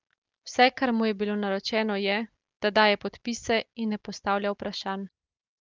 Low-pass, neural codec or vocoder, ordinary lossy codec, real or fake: 7.2 kHz; none; Opus, 24 kbps; real